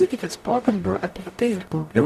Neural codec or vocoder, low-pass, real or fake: codec, 44.1 kHz, 0.9 kbps, DAC; 14.4 kHz; fake